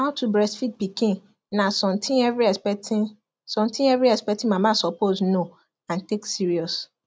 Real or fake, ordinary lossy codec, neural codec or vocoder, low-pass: real; none; none; none